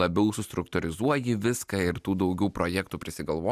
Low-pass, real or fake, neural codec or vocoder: 14.4 kHz; real; none